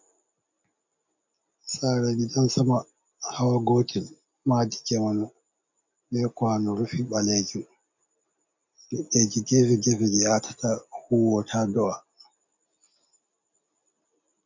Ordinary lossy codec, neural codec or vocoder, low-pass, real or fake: MP3, 48 kbps; none; 7.2 kHz; real